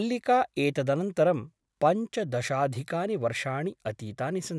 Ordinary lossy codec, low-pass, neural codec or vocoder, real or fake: none; none; none; real